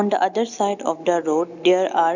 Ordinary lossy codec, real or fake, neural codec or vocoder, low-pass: none; real; none; 7.2 kHz